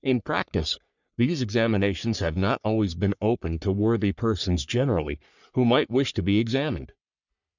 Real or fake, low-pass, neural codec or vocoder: fake; 7.2 kHz; codec, 44.1 kHz, 3.4 kbps, Pupu-Codec